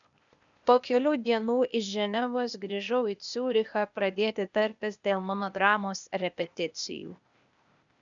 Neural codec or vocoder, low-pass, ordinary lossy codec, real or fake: codec, 16 kHz, 0.8 kbps, ZipCodec; 7.2 kHz; MP3, 96 kbps; fake